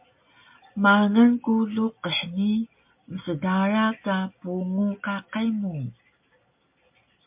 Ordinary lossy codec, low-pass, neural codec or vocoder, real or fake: MP3, 32 kbps; 3.6 kHz; none; real